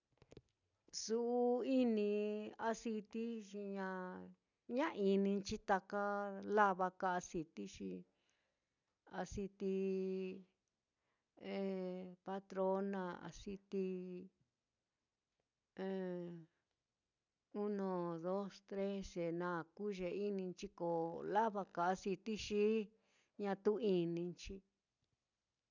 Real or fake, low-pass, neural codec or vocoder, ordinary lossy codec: real; 7.2 kHz; none; none